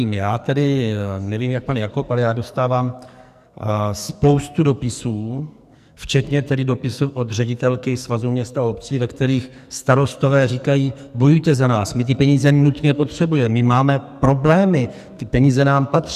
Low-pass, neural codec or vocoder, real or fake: 14.4 kHz; codec, 44.1 kHz, 2.6 kbps, SNAC; fake